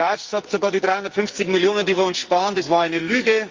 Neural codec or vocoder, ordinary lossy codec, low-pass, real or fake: codec, 44.1 kHz, 2.6 kbps, SNAC; Opus, 32 kbps; 7.2 kHz; fake